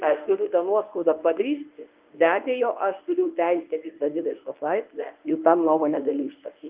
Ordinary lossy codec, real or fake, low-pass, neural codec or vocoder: Opus, 24 kbps; fake; 3.6 kHz; codec, 24 kHz, 0.9 kbps, WavTokenizer, medium speech release version 1